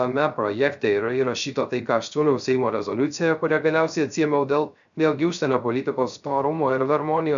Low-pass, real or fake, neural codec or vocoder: 7.2 kHz; fake; codec, 16 kHz, 0.3 kbps, FocalCodec